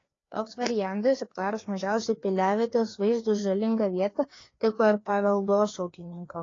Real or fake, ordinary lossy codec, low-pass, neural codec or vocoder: fake; AAC, 32 kbps; 7.2 kHz; codec, 16 kHz, 2 kbps, FreqCodec, larger model